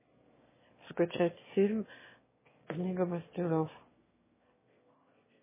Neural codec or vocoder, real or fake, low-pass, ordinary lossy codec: autoencoder, 22.05 kHz, a latent of 192 numbers a frame, VITS, trained on one speaker; fake; 3.6 kHz; MP3, 16 kbps